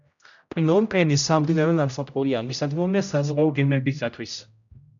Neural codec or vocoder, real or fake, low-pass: codec, 16 kHz, 0.5 kbps, X-Codec, HuBERT features, trained on general audio; fake; 7.2 kHz